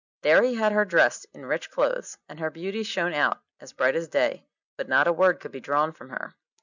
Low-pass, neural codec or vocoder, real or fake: 7.2 kHz; none; real